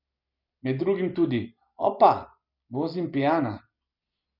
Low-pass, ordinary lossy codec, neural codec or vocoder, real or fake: 5.4 kHz; none; none; real